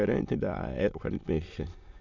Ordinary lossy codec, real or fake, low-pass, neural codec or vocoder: none; fake; 7.2 kHz; autoencoder, 22.05 kHz, a latent of 192 numbers a frame, VITS, trained on many speakers